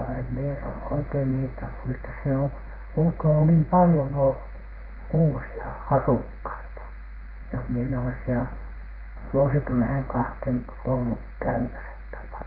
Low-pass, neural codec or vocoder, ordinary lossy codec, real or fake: 5.4 kHz; codec, 16 kHz in and 24 kHz out, 1 kbps, XY-Tokenizer; Opus, 32 kbps; fake